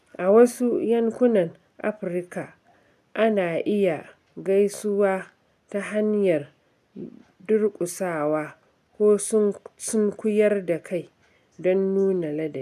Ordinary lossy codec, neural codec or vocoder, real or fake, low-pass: none; none; real; 14.4 kHz